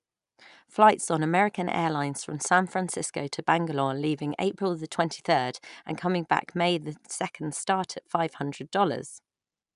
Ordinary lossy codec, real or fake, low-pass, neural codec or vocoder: none; real; 10.8 kHz; none